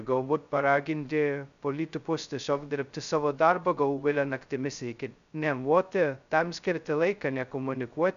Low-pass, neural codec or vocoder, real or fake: 7.2 kHz; codec, 16 kHz, 0.2 kbps, FocalCodec; fake